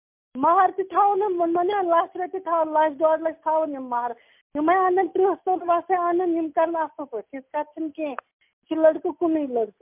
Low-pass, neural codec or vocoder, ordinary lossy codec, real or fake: 3.6 kHz; none; MP3, 32 kbps; real